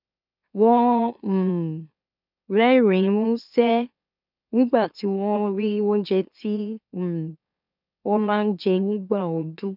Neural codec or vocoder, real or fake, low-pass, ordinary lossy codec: autoencoder, 44.1 kHz, a latent of 192 numbers a frame, MeloTTS; fake; 5.4 kHz; none